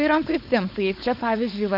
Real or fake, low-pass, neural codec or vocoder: fake; 5.4 kHz; codec, 16 kHz, 4.8 kbps, FACodec